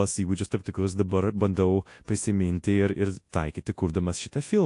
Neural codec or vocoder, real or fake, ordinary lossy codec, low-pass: codec, 24 kHz, 0.9 kbps, WavTokenizer, large speech release; fake; AAC, 48 kbps; 10.8 kHz